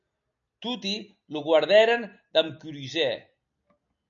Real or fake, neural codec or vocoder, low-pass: real; none; 7.2 kHz